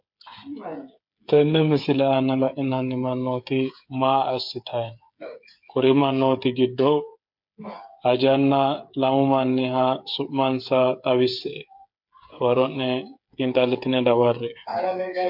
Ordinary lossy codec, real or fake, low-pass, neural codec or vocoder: MP3, 48 kbps; fake; 5.4 kHz; codec, 16 kHz, 8 kbps, FreqCodec, smaller model